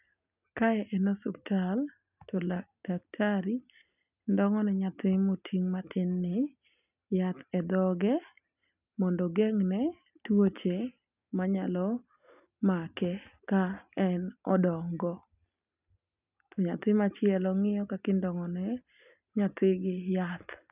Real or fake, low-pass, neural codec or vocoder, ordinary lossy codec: real; 3.6 kHz; none; none